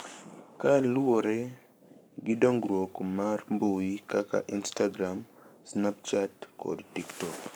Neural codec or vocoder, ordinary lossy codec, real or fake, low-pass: codec, 44.1 kHz, 7.8 kbps, Pupu-Codec; none; fake; none